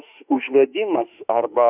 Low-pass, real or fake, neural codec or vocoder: 3.6 kHz; fake; autoencoder, 48 kHz, 32 numbers a frame, DAC-VAE, trained on Japanese speech